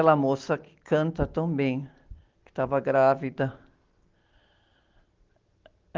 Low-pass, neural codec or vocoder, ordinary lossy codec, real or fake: 7.2 kHz; none; Opus, 16 kbps; real